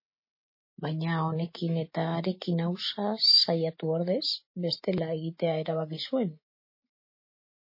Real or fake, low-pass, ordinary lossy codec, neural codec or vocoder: real; 5.4 kHz; MP3, 24 kbps; none